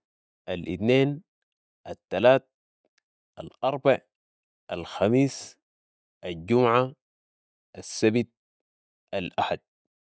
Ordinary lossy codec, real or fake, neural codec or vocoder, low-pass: none; real; none; none